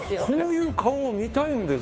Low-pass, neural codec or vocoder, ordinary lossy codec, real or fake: none; codec, 16 kHz, 2 kbps, FunCodec, trained on Chinese and English, 25 frames a second; none; fake